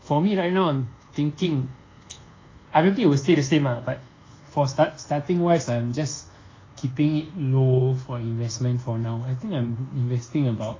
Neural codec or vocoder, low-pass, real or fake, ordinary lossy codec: codec, 24 kHz, 1.2 kbps, DualCodec; 7.2 kHz; fake; AAC, 32 kbps